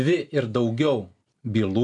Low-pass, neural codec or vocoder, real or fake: 10.8 kHz; none; real